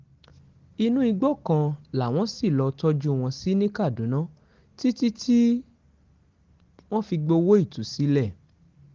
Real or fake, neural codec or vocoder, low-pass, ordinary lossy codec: real; none; 7.2 kHz; Opus, 16 kbps